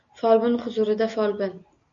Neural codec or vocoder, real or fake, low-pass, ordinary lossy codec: none; real; 7.2 kHz; MP3, 48 kbps